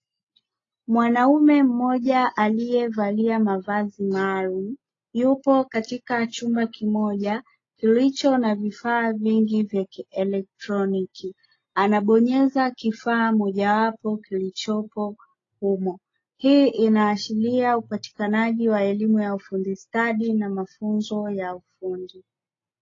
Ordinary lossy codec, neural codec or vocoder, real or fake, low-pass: AAC, 32 kbps; none; real; 7.2 kHz